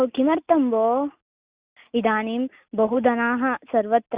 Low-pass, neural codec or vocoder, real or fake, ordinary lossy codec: 3.6 kHz; none; real; Opus, 64 kbps